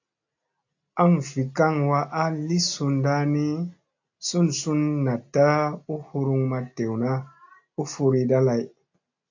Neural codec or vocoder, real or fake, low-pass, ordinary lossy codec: none; real; 7.2 kHz; AAC, 48 kbps